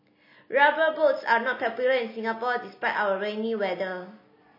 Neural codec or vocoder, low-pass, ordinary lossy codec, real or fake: none; 5.4 kHz; MP3, 24 kbps; real